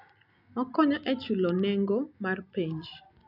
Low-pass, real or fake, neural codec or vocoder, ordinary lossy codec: 5.4 kHz; real; none; none